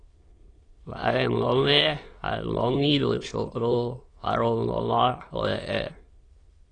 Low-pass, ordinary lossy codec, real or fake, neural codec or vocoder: 9.9 kHz; AAC, 32 kbps; fake; autoencoder, 22.05 kHz, a latent of 192 numbers a frame, VITS, trained on many speakers